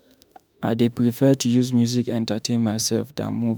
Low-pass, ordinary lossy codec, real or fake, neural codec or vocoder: none; none; fake; autoencoder, 48 kHz, 32 numbers a frame, DAC-VAE, trained on Japanese speech